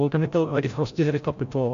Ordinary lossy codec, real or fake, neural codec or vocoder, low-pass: AAC, 64 kbps; fake; codec, 16 kHz, 0.5 kbps, FreqCodec, larger model; 7.2 kHz